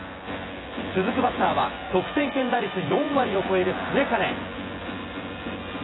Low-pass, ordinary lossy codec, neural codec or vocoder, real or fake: 7.2 kHz; AAC, 16 kbps; vocoder, 24 kHz, 100 mel bands, Vocos; fake